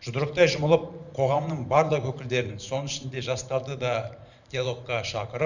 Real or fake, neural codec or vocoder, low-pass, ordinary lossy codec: fake; vocoder, 44.1 kHz, 128 mel bands every 512 samples, BigVGAN v2; 7.2 kHz; none